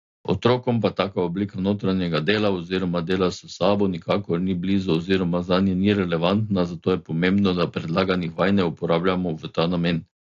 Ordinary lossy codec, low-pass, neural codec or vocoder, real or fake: AAC, 48 kbps; 7.2 kHz; none; real